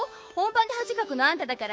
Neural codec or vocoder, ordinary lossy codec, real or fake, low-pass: codec, 16 kHz, 6 kbps, DAC; none; fake; none